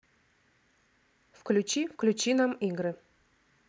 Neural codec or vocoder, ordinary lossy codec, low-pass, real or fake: none; none; none; real